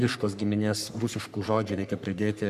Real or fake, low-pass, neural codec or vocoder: fake; 14.4 kHz; codec, 44.1 kHz, 3.4 kbps, Pupu-Codec